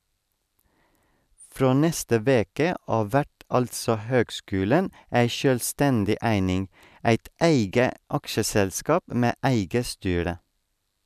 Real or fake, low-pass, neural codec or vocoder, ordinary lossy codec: real; 14.4 kHz; none; none